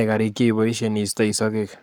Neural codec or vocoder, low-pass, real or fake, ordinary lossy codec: codec, 44.1 kHz, 7.8 kbps, Pupu-Codec; none; fake; none